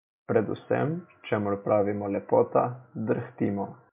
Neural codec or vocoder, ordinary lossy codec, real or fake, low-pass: none; MP3, 32 kbps; real; 3.6 kHz